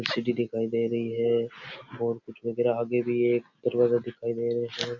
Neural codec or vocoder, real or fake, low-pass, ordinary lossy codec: none; real; 7.2 kHz; none